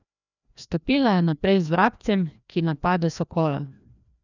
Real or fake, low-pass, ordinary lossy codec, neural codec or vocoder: fake; 7.2 kHz; none; codec, 16 kHz, 1 kbps, FreqCodec, larger model